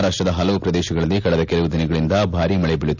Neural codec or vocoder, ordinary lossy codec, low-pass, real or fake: none; none; 7.2 kHz; real